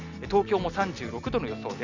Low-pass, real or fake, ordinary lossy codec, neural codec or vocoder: 7.2 kHz; real; none; none